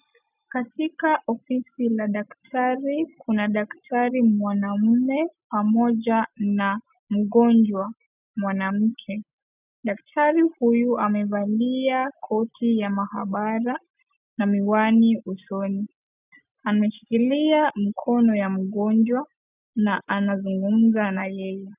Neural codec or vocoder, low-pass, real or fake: none; 3.6 kHz; real